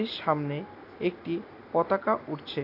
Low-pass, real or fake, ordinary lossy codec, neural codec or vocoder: 5.4 kHz; real; AAC, 32 kbps; none